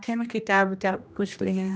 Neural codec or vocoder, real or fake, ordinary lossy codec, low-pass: codec, 16 kHz, 1 kbps, X-Codec, HuBERT features, trained on general audio; fake; none; none